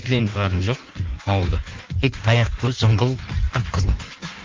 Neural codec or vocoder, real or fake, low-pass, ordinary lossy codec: codec, 16 kHz in and 24 kHz out, 1.1 kbps, FireRedTTS-2 codec; fake; 7.2 kHz; Opus, 32 kbps